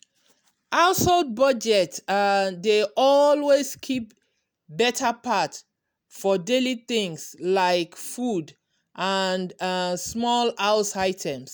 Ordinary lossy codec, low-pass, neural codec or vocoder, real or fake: none; none; none; real